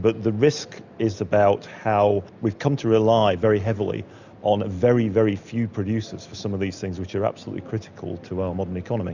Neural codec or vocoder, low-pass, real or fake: none; 7.2 kHz; real